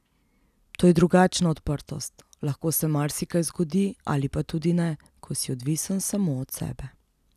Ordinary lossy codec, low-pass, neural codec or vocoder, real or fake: none; 14.4 kHz; none; real